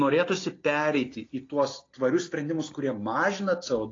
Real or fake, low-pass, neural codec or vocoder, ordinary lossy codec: real; 7.2 kHz; none; AAC, 32 kbps